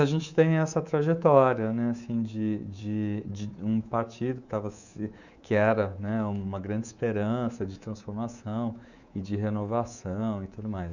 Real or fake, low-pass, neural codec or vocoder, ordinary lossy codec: fake; 7.2 kHz; codec, 24 kHz, 3.1 kbps, DualCodec; none